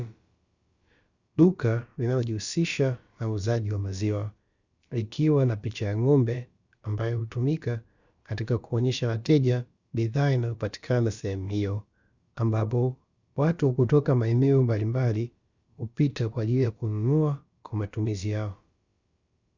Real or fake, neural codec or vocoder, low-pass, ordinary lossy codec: fake; codec, 16 kHz, about 1 kbps, DyCAST, with the encoder's durations; 7.2 kHz; Opus, 64 kbps